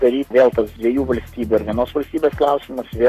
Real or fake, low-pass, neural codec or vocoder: fake; 14.4 kHz; codec, 44.1 kHz, 7.8 kbps, Pupu-Codec